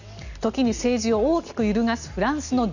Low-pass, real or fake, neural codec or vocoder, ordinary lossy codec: 7.2 kHz; real; none; none